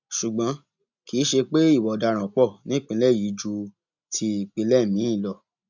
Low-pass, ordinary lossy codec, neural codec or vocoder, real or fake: 7.2 kHz; none; none; real